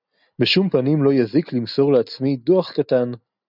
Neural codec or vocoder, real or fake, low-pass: none; real; 5.4 kHz